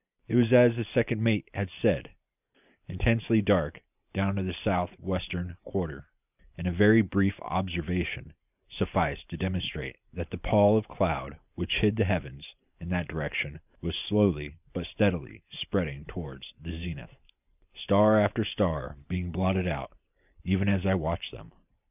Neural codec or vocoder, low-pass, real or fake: vocoder, 44.1 kHz, 128 mel bands every 512 samples, BigVGAN v2; 3.6 kHz; fake